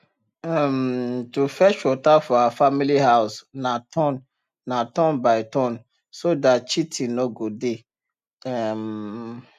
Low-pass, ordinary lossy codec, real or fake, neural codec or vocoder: 14.4 kHz; none; real; none